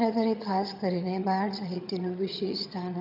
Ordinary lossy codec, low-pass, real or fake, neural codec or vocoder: none; 5.4 kHz; fake; vocoder, 22.05 kHz, 80 mel bands, HiFi-GAN